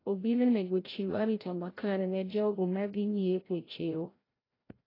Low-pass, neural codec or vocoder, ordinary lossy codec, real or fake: 5.4 kHz; codec, 16 kHz, 0.5 kbps, FreqCodec, larger model; AAC, 24 kbps; fake